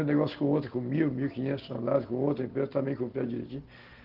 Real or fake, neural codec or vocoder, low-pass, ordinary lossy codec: real; none; 5.4 kHz; Opus, 16 kbps